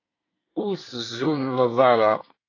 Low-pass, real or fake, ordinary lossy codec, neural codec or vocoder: 7.2 kHz; fake; AAC, 32 kbps; codec, 24 kHz, 1 kbps, SNAC